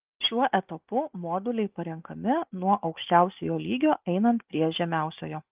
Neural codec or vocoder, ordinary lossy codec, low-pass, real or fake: none; Opus, 24 kbps; 3.6 kHz; real